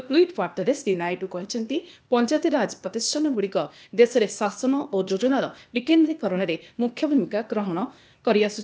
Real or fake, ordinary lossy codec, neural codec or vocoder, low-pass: fake; none; codec, 16 kHz, 0.8 kbps, ZipCodec; none